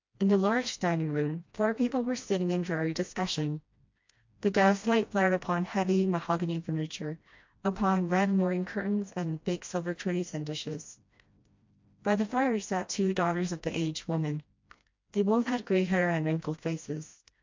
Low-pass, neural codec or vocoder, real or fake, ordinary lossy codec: 7.2 kHz; codec, 16 kHz, 1 kbps, FreqCodec, smaller model; fake; MP3, 48 kbps